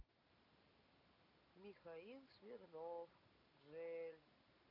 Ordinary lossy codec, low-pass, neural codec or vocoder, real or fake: none; 5.4 kHz; none; real